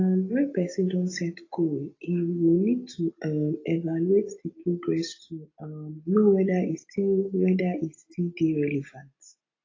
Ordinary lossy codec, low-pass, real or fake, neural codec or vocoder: AAC, 32 kbps; 7.2 kHz; real; none